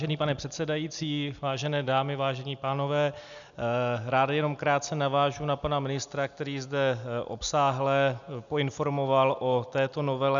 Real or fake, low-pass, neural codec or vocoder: real; 7.2 kHz; none